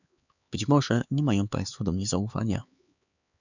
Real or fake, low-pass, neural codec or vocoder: fake; 7.2 kHz; codec, 16 kHz, 4 kbps, X-Codec, HuBERT features, trained on LibriSpeech